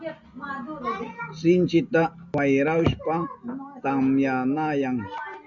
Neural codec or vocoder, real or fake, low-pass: none; real; 7.2 kHz